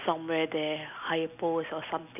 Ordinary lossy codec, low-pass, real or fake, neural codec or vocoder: none; 3.6 kHz; real; none